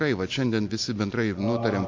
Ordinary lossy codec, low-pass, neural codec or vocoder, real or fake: MP3, 48 kbps; 7.2 kHz; none; real